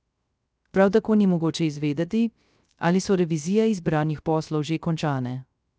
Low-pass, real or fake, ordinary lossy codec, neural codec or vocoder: none; fake; none; codec, 16 kHz, 0.3 kbps, FocalCodec